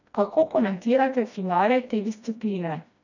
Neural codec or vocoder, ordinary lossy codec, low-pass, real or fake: codec, 16 kHz, 1 kbps, FreqCodec, smaller model; none; 7.2 kHz; fake